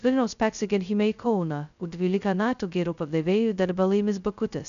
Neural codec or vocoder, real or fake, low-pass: codec, 16 kHz, 0.2 kbps, FocalCodec; fake; 7.2 kHz